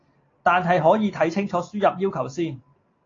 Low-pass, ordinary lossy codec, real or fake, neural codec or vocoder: 7.2 kHz; AAC, 32 kbps; real; none